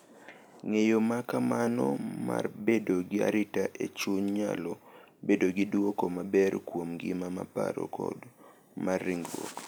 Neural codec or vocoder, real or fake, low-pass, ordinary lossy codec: none; real; none; none